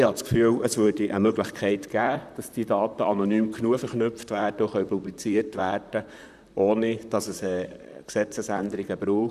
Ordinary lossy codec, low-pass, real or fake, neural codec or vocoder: none; 14.4 kHz; fake; vocoder, 44.1 kHz, 128 mel bands, Pupu-Vocoder